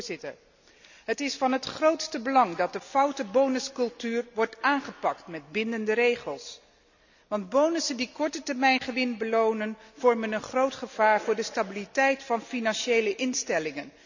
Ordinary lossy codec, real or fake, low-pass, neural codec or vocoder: none; real; 7.2 kHz; none